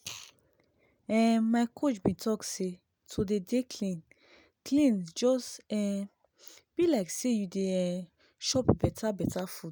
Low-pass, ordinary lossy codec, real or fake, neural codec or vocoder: none; none; real; none